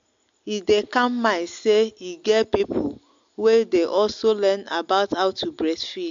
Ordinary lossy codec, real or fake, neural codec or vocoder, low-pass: AAC, 64 kbps; real; none; 7.2 kHz